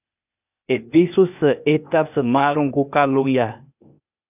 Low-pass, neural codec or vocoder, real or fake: 3.6 kHz; codec, 16 kHz, 0.8 kbps, ZipCodec; fake